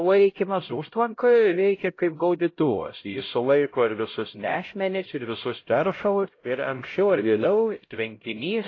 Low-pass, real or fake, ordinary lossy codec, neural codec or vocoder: 7.2 kHz; fake; AAC, 32 kbps; codec, 16 kHz, 0.5 kbps, X-Codec, HuBERT features, trained on LibriSpeech